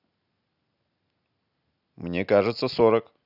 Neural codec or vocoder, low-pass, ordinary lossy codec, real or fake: none; 5.4 kHz; none; real